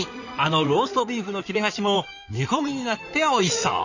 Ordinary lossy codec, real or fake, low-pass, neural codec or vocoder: none; fake; 7.2 kHz; codec, 16 kHz in and 24 kHz out, 2.2 kbps, FireRedTTS-2 codec